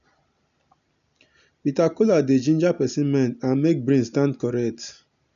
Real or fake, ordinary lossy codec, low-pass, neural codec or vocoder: real; none; 7.2 kHz; none